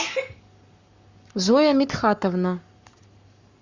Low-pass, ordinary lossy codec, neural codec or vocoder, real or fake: 7.2 kHz; Opus, 64 kbps; codec, 44.1 kHz, 7.8 kbps, Pupu-Codec; fake